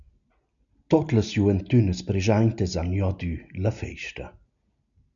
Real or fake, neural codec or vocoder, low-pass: real; none; 7.2 kHz